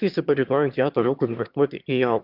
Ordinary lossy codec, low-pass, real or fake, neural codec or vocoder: Opus, 64 kbps; 5.4 kHz; fake; autoencoder, 22.05 kHz, a latent of 192 numbers a frame, VITS, trained on one speaker